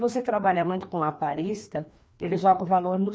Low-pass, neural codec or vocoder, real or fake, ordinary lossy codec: none; codec, 16 kHz, 2 kbps, FreqCodec, larger model; fake; none